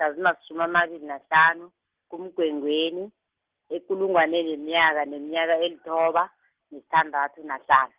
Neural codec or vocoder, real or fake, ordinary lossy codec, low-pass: none; real; Opus, 16 kbps; 3.6 kHz